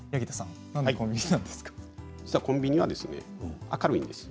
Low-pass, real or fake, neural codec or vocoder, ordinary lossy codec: none; real; none; none